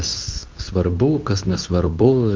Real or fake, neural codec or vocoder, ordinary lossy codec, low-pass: fake; codec, 24 kHz, 0.9 kbps, WavTokenizer, medium speech release version 2; Opus, 32 kbps; 7.2 kHz